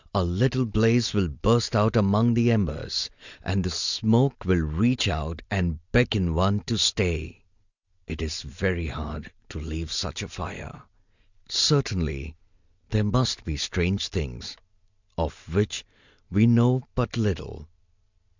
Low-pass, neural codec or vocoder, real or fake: 7.2 kHz; none; real